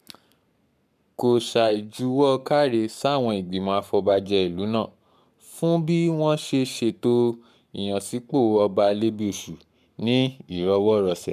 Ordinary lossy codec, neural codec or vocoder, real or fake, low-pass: none; codec, 44.1 kHz, 7.8 kbps, Pupu-Codec; fake; 14.4 kHz